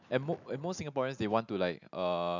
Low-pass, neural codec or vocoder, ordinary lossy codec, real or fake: 7.2 kHz; none; none; real